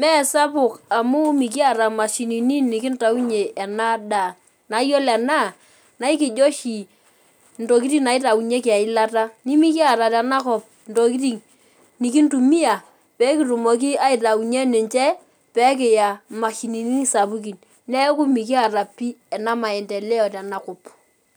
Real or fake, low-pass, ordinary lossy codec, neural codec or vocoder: real; none; none; none